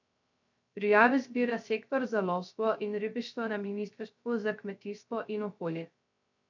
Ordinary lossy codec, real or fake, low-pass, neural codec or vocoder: MP3, 64 kbps; fake; 7.2 kHz; codec, 16 kHz, 0.3 kbps, FocalCodec